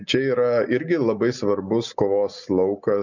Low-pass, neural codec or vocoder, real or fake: 7.2 kHz; none; real